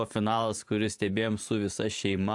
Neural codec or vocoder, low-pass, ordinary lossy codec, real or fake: vocoder, 44.1 kHz, 128 mel bands every 512 samples, BigVGAN v2; 10.8 kHz; AAC, 64 kbps; fake